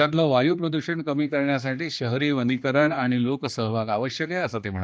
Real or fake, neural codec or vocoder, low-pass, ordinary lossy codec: fake; codec, 16 kHz, 2 kbps, X-Codec, HuBERT features, trained on general audio; none; none